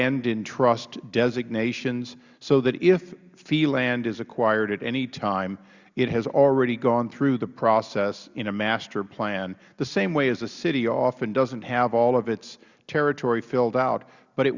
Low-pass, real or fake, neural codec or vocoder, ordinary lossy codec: 7.2 kHz; real; none; Opus, 64 kbps